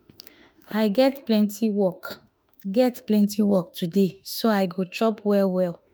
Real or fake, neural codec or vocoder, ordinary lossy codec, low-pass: fake; autoencoder, 48 kHz, 32 numbers a frame, DAC-VAE, trained on Japanese speech; none; none